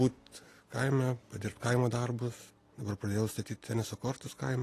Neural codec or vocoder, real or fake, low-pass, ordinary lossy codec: none; real; 14.4 kHz; AAC, 48 kbps